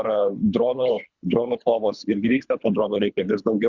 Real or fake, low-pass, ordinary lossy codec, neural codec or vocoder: fake; 7.2 kHz; Opus, 64 kbps; codec, 24 kHz, 3 kbps, HILCodec